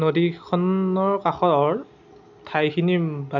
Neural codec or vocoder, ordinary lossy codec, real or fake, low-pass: none; none; real; 7.2 kHz